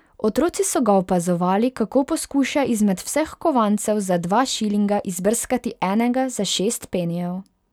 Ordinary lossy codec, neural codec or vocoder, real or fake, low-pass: none; none; real; 19.8 kHz